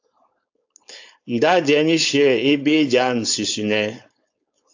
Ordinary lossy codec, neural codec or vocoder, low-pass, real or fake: AAC, 48 kbps; codec, 16 kHz, 4.8 kbps, FACodec; 7.2 kHz; fake